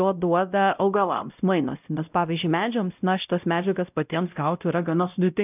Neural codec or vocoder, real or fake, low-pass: codec, 16 kHz, 0.5 kbps, X-Codec, WavLM features, trained on Multilingual LibriSpeech; fake; 3.6 kHz